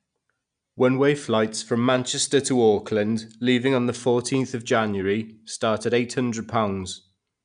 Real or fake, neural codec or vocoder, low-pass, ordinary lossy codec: real; none; 9.9 kHz; MP3, 96 kbps